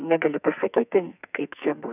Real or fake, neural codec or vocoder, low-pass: fake; codec, 44.1 kHz, 2.6 kbps, SNAC; 3.6 kHz